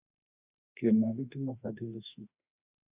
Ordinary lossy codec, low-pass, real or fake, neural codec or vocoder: Opus, 64 kbps; 3.6 kHz; fake; autoencoder, 48 kHz, 32 numbers a frame, DAC-VAE, trained on Japanese speech